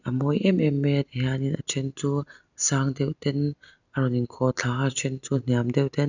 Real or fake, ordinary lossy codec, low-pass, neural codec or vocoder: real; none; 7.2 kHz; none